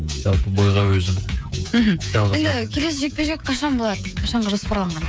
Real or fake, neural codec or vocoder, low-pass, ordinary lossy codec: fake; codec, 16 kHz, 8 kbps, FreqCodec, smaller model; none; none